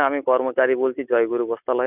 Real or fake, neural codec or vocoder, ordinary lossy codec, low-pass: real; none; none; 3.6 kHz